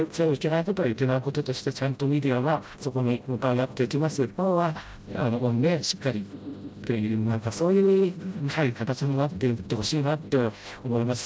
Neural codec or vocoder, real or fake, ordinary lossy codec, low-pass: codec, 16 kHz, 0.5 kbps, FreqCodec, smaller model; fake; none; none